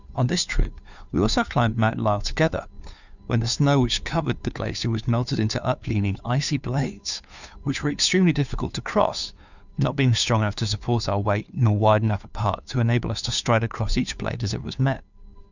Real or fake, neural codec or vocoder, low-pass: fake; codec, 16 kHz, 2 kbps, FunCodec, trained on Chinese and English, 25 frames a second; 7.2 kHz